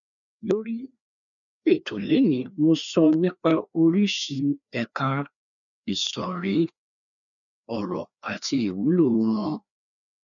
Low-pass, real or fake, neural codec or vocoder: 7.2 kHz; fake; codec, 16 kHz, 2 kbps, FreqCodec, larger model